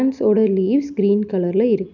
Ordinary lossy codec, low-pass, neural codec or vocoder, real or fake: none; 7.2 kHz; none; real